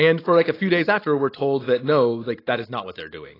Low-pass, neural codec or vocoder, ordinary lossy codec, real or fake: 5.4 kHz; none; AAC, 24 kbps; real